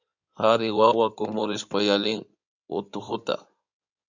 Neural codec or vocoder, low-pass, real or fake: codec, 16 kHz in and 24 kHz out, 2.2 kbps, FireRedTTS-2 codec; 7.2 kHz; fake